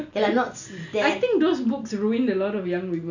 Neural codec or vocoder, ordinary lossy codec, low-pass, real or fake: none; none; 7.2 kHz; real